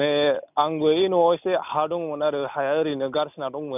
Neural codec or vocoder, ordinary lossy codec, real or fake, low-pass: none; none; real; 3.6 kHz